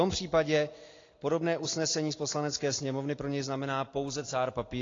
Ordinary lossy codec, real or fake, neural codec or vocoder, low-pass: AAC, 32 kbps; real; none; 7.2 kHz